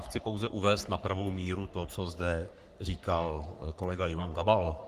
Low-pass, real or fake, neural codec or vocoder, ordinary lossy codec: 14.4 kHz; fake; codec, 44.1 kHz, 3.4 kbps, Pupu-Codec; Opus, 24 kbps